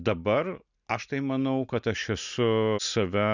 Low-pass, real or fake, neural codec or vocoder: 7.2 kHz; real; none